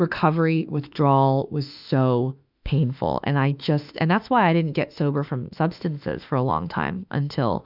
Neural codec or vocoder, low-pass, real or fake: autoencoder, 48 kHz, 32 numbers a frame, DAC-VAE, trained on Japanese speech; 5.4 kHz; fake